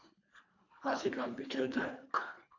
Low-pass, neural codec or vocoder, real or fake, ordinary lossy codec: 7.2 kHz; codec, 24 kHz, 1.5 kbps, HILCodec; fake; AAC, 48 kbps